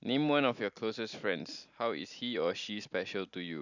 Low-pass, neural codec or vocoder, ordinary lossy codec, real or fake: 7.2 kHz; none; none; real